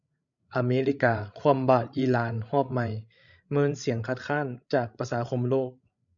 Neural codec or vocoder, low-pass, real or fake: codec, 16 kHz, 16 kbps, FreqCodec, larger model; 7.2 kHz; fake